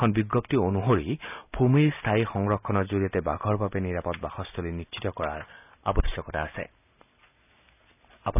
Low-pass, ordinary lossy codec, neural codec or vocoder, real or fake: 3.6 kHz; none; none; real